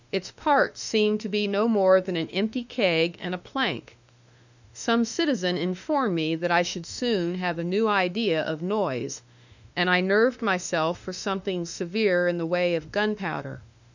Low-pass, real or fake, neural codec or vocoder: 7.2 kHz; fake; autoencoder, 48 kHz, 32 numbers a frame, DAC-VAE, trained on Japanese speech